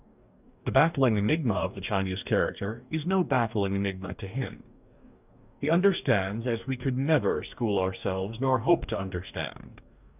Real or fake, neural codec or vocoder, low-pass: fake; codec, 44.1 kHz, 2.6 kbps, DAC; 3.6 kHz